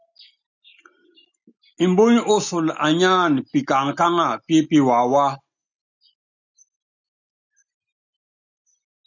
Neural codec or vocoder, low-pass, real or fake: none; 7.2 kHz; real